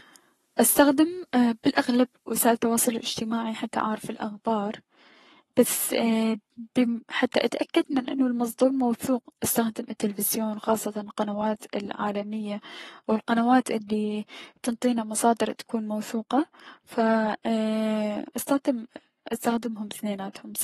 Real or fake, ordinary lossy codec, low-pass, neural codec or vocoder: fake; AAC, 32 kbps; 19.8 kHz; codec, 44.1 kHz, 7.8 kbps, Pupu-Codec